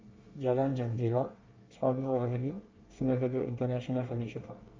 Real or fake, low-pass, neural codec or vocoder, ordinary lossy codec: fake; 7.2 kHz; codec, 24 kHz, 1 kbps, SNAC; Opus, 32 kbps